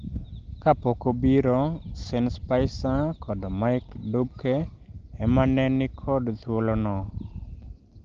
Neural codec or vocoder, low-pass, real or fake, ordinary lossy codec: none; 7.2 kHz; real; Opus, 16 kbps